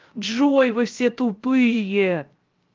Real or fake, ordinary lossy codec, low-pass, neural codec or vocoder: fake; Opus, 32 kbps; 7.2 kHz; codec, 16 kHz, 0.3 kbps, FocalCodec